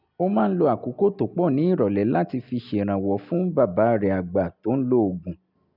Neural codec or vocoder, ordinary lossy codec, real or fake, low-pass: none; none; real; 5.4 kHz